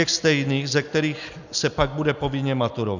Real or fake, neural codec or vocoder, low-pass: real; none; 7.2 kHz